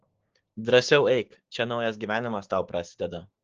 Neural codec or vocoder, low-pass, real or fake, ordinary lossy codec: codec, 16 kHz, 6 kbps, DAC; 7.2 kHz; fake; Opus, 16 kbps